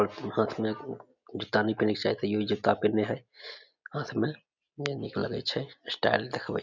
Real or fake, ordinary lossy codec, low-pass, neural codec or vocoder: real; none; 7.2 kHz; none